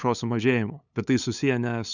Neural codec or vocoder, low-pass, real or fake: codec, 16 kHz, 8 kbps, FunCodec, trained on LibriTTS, 25 frames a second; 7.2 kHz; fake